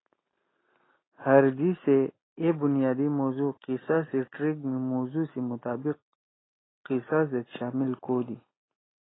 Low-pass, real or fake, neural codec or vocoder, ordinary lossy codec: 7.2 kHz; real; none; AAC, 16 kbps